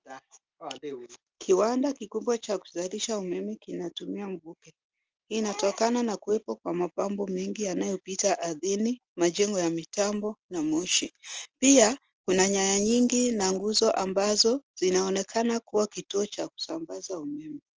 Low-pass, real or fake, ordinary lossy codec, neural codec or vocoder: 7.2 kHz; real; Opus, 24 kbps; none